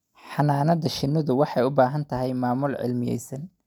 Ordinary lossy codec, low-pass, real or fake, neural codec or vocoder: none; 19.8 kHz; real; none